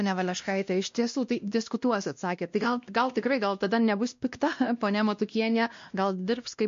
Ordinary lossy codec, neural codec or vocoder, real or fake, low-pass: MP3, 48 kbps; codec, 16 kHz, 1 kbps, X-Codec, WavLM features, trained on Multilingual LibriSpeech; fake; 7.2 kHz